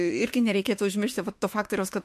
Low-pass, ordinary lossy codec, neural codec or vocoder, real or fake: 14.4 kHz; MP3, 64 kbps; autoencoder, 48 kHz, 32 numbers a frame, DAC-VAE, trained on Japanese speech; fake